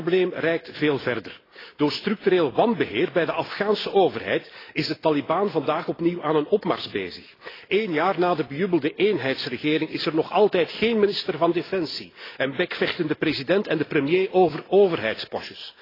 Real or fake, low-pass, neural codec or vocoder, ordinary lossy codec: real; 5.4 kHz; none; AAC, 24 kbps